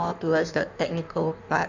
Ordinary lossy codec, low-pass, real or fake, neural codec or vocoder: none; 7.2 kHz; fake; codec, 16 kHz in and 24 kHz out, 1.1 kbps, FireRedTTS-2 codec